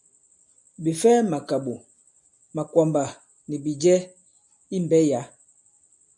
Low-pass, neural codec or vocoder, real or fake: 10.8 kHz; none; real